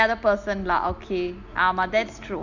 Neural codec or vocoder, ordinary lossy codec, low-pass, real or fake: none; Opus, 64 kbps; 7.2 kHz; real